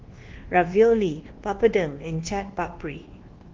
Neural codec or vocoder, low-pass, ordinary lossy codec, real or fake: codec, 24 kHz, 1.2 kbps, DualCodec; 7.2 kHz; Opus, 16 kbps; fake